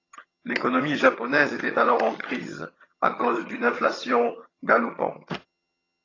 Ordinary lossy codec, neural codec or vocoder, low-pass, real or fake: AAC, 32 kbps; vocoder, 22.05 kHz, 80 mel bands, HiFi-GAN; 7.2 kHz; fake